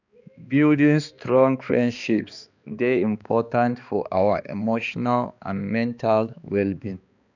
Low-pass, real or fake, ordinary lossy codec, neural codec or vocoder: 7.2 kHz; fake; none; codec, 16 kHz, 2 kbps, X-Codec, HuBERT features, trained on balanced general audio